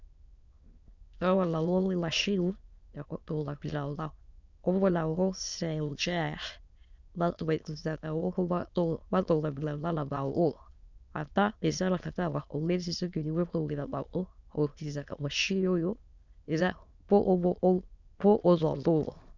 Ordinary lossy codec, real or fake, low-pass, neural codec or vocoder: Opus, 64 kbps; fake; 7.2 kHz; autoencoder, 22.05 kHz, a latent of 192 numbers a frame, VITS, trained on many speakers